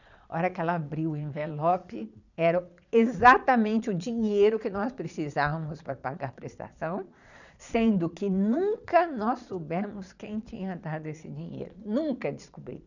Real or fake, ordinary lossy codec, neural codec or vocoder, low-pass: fake; none; vocoder, 22.05 kHz, 80 mel bands, Vocos; 7.2 kHz